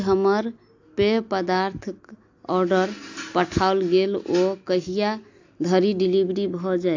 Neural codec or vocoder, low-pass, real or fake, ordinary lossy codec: none; 7.2 kHz; real; AAC, 48 kbps